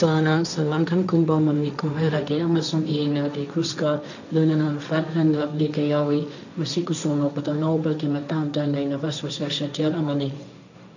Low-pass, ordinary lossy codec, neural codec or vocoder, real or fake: 7.2 kHz; none; codec, 16 kHz, 1.1 kbps, Voila-Tokenizer; fake